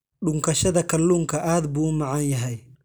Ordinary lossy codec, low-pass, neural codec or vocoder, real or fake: none; none; none; real